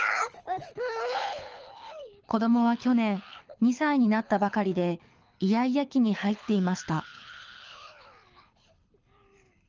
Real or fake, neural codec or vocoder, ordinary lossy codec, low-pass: fake; codec, 24 kHz, 6 kbps, HILCodec; Opus, 24 kbps; 7.2 kHz